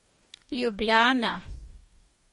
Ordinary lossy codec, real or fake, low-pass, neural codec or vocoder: MP3, 48 kbps; fake; 19.8 kHz; codec, 44.1 kHz, 2.6 kbps, DAC